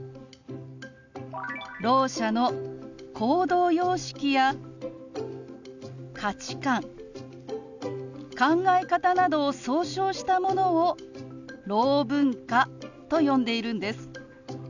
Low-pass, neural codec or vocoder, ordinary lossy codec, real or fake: 7.2 kHz; none; none; real